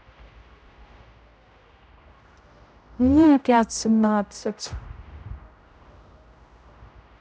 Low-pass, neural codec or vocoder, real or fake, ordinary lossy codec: none; codec, 16 kHz, 0.5 kbps, X-Codec, HuBERT features, trained on general audio; fake; none